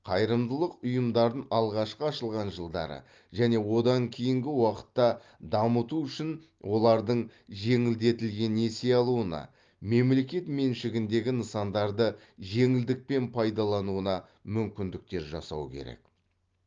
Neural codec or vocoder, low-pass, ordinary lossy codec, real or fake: none; 7.2 kHz; Opus, 32 kbps; real